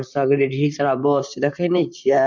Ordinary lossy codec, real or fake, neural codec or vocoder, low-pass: MP3, 64 kbps; fake; vocoder, 44.1 kHz, 128 mel bands, Pupu-Vocoder; 7.2 kHz